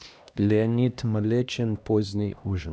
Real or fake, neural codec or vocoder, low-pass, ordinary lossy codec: fake; codec, 16 kHz, 1 kbps, X-Codec, HuBERT features, trained on LibriSpeech; none; none